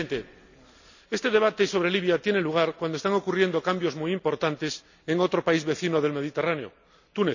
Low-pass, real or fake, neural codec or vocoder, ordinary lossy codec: 7.2 kHz; real; none; none